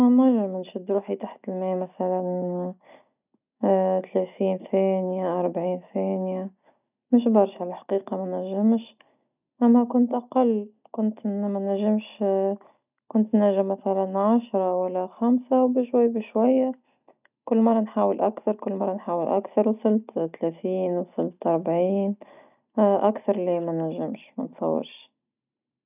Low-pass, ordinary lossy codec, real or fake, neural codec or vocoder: 3.6 kHz; none; real; none